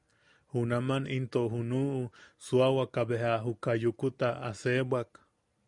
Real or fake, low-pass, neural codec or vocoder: real; 10.8 kHz; none